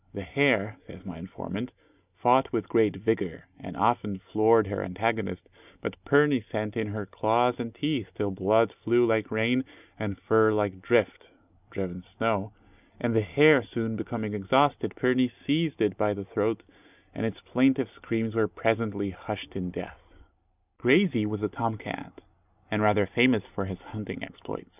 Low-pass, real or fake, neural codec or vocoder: 3.6 kHz; real; none